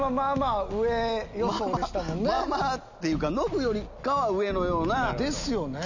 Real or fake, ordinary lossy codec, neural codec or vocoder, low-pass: real; none; none; 7.2 kHz